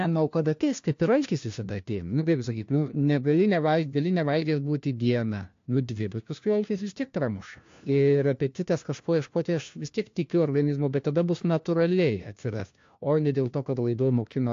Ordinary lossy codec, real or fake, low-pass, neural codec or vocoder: AAC, 48 kbps; fake; 7.2 kHz; codec, 16 kHz, 1 kbps, FunCodec, trained on LibriTTS, 50 frames a second